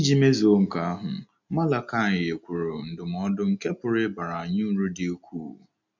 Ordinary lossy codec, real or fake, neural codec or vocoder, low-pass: none; real; none; 7.2 kHz